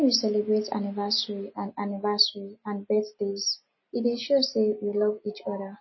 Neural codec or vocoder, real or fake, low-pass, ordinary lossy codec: none; real; 7.2 kHz; MP3, 24 kbps